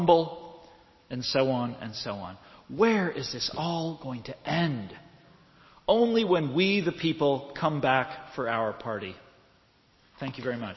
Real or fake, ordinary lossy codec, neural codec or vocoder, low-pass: real; MP3, 24 kbps; none; 7.2 kHz